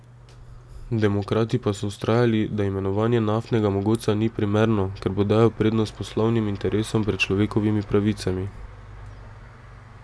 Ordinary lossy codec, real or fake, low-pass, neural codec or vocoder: none; real; none; none